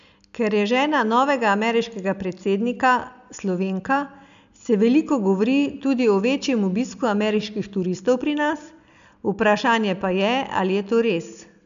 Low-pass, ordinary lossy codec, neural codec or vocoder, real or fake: 7.2 kHz; none; none; real